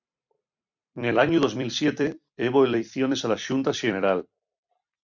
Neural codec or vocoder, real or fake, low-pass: vocoder, 44.1 kHz, 128 mel bands every 256 samples, BigVGAN v2; fake; 7.2 kHz